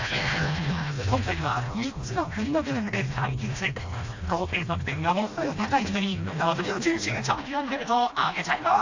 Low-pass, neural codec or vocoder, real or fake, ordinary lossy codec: 7.2 kHz; codec, 16 kHz, 1 kbps, FreqCodec, smaller model; fake; AAC, 48 kbps